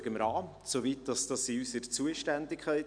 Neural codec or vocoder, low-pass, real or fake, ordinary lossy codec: none; 9.9 kHz; real; none